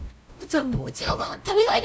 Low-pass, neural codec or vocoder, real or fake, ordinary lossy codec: none; codec, 16 kHz, 0.5 kbps, FunCodec, trained on LibriTTS, 25 frames a second; fake; none